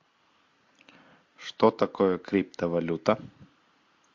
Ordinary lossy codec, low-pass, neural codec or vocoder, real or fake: MP3, 48 kbps; 7.2 kHz; none; real